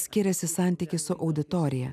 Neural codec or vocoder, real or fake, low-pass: none; real; 14.4 kHz